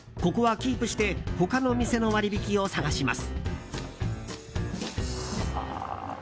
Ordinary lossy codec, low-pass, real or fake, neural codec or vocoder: none; none; real; none